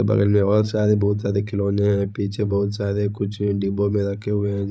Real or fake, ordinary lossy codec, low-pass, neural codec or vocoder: fake; none; none; codec, 16 kHz, 16 kbps, FreqCodec, larger model